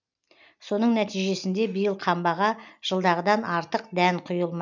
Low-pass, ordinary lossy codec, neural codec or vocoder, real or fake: 7.2 kHz; none; none; real